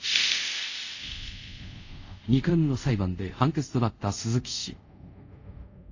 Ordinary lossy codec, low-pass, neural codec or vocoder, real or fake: AAC, 48 kbps; 7.2 kHz; codec, 24 kHz, 0.5 kbps, DualCodec; fake